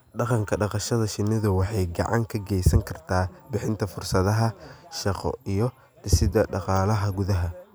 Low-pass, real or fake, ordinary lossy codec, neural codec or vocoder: none; real; none; none